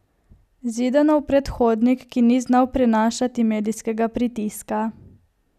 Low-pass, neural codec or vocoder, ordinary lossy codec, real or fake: 14.4 kHz; none; none; real